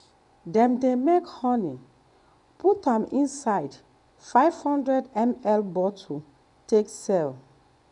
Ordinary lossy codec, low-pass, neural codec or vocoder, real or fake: none; 10.8 kHz; vocoder, 24 kHz, 100 mel bands, Vocos; fake